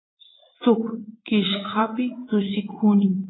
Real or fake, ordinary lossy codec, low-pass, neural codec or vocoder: fake; AAC, 16 kbps; 7.2 kHz; vocoder, 44.1 kHz, 80 mel bands, Vocos